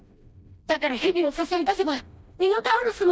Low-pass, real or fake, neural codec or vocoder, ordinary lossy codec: none; fake; codec, 16 kHz, 1 kbps, FreqCodec, smaller model; none